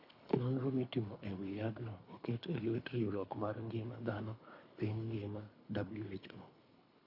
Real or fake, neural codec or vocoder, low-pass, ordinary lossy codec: fake; codec, 24 kHz, 3 kbps, HILCodec; 5.4 kHz; AAC, 32 kbps